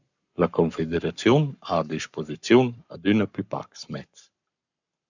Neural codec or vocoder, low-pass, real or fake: codec, 44.1 kHz, 7.8 kbps, Pupu-Codec; 7.2 kHz; fake